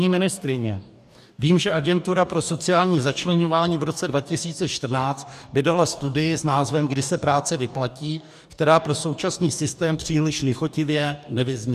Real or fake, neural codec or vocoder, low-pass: fake; codec, 44.1 kHz, 2.6 kbps, DAC; 14.4 kHz